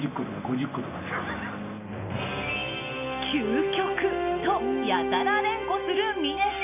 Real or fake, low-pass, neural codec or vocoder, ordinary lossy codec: real; 3.6 kHz; none; none